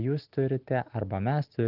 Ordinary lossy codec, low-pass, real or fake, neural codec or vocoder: Opus, 16 kbps; 5.4 kHz; real; none